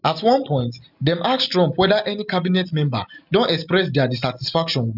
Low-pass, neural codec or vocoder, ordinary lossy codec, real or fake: 5.4 kHz; none; none; real